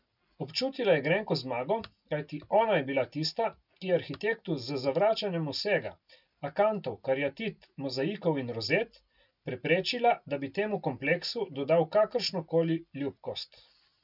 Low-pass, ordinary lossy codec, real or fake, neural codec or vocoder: 5.4 kHz; none; real; none